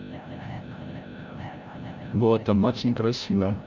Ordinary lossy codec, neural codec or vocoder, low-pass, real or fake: none; codec, 16 kHz, 0.5 kbps, FreqCodec, larger model; 7.2 kHz; fake